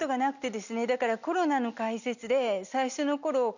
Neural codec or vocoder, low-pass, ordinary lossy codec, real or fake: none; 7.2 kHz; none; real